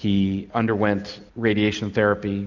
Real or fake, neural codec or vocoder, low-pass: real; none; 7.2 kHz